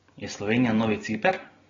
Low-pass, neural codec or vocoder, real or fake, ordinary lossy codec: 7.2 kHz; none; real; AAC, 24 kbps